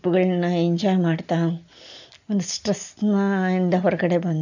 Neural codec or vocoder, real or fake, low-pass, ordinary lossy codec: none; real; 7.2 kHz; none